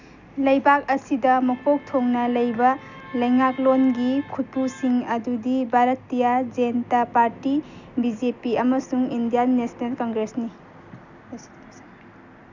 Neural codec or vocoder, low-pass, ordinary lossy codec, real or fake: none; 7.2 kHz; none; real